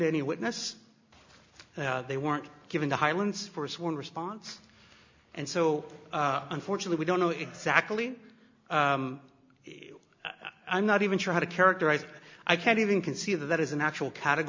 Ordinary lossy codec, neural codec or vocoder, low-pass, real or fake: MP3, 64 kbps; none; 7.2 kHz; real